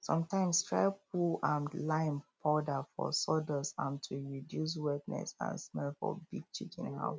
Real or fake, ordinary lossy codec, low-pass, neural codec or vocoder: real; none; none; none